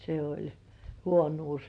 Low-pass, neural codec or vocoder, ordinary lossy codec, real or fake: 9.9 kHz; none; MP3, 64 kbps; real